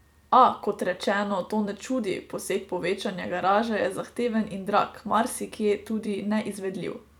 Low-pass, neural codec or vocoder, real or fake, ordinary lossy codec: 19.8 kHz; vocoder, 44.1 kHz, 128 mel bands every 256 samples, BigVGAN v2; fake; none